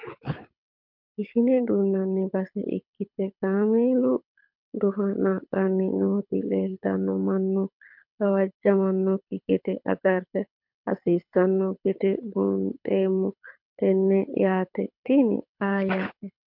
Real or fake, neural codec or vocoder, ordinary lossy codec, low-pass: fake; codec, 24 kHz, 6 kbps, HILCodec; MP3, 48 kbps; 5.4 kHz